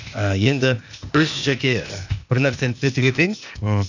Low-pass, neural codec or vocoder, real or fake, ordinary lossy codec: 7.2 kHz; codec, 16 kHz, 0.8 kbps, ZipCodec; fake; none